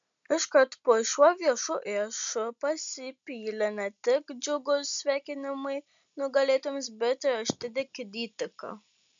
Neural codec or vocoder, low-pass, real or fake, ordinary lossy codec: none; 7.2 kHz; real; MP3, 64 kbps